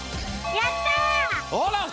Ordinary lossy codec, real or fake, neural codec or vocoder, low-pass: none; real; none; none